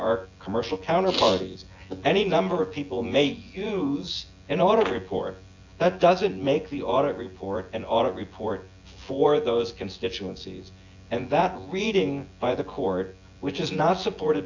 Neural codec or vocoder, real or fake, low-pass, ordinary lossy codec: vocoder, 24 kHz, 100 mel bands, Vocos; fake; 7.2 kHz; AAC, 48 kbps